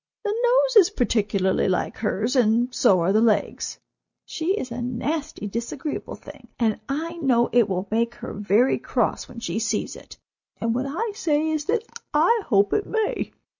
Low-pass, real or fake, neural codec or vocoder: 7.2 kHz; real; none